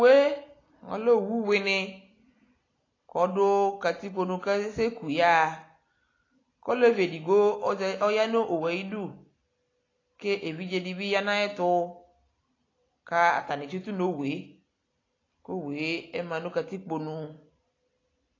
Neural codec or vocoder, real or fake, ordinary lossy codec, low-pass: none; real; AAC, 32 kbps; 7.2 kHz